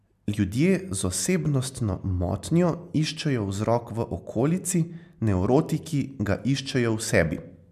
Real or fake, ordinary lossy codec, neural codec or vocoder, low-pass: real; MP3, 96 kbps; none; 14.4 kHz